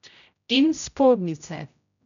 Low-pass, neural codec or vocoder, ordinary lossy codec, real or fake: 7.2 kHz; codec, 16 kHz, 0.5 kbps, X-Codec, HuBERT features, trained on general audio; none; fake